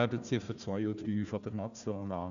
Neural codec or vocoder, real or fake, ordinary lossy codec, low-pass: codec, 16 kHz, 1 kbps, FunCodec, trained on Chinese and English, 50 frames a second; fake; none; 7.2 kHz